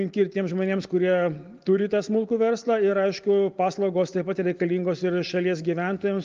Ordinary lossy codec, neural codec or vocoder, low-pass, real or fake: Opus, 32 kbps; none; 7.2 kHz; real